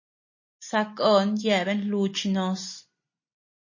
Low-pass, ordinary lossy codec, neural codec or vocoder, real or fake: 7.2 kHz; MP3, 32 kbps; none; real